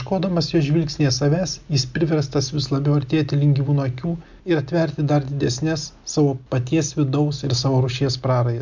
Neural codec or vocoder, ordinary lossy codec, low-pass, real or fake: none; MP3, 64 kbps; 7.2 kHz; real